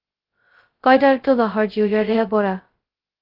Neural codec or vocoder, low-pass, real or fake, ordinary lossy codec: codec, 16 kHz, 0.2 kbps, FocalCodec; 5.4 kHz; fake; Opus, 16 kbps